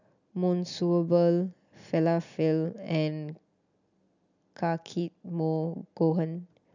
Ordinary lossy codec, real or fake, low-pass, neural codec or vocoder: none; real; 7.2 kHz; none